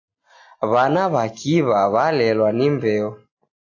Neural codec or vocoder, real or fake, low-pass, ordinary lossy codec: none; real; 7.2 kHz; AAC, 48 kbps